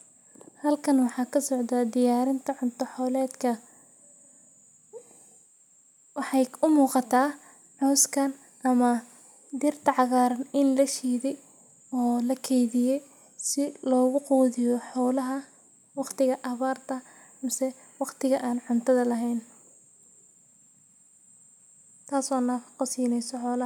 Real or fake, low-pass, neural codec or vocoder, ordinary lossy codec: real; 19.8 kHz; none; none